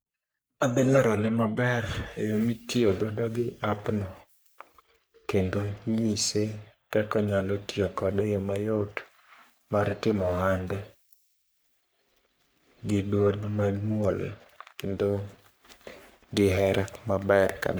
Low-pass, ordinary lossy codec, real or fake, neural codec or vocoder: none; none; fake; codec, 44.1 kHz, 3.4 kbps, Pupu-Codec